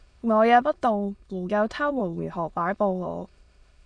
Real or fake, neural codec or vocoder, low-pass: fake; autoencoder, 22.05 kHz, a latent of 192 numbers a frame, VITS, trained on many speakers; 9.9 kHz